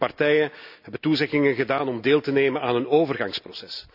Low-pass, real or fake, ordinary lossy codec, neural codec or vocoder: 5.4 kHz; real; none; none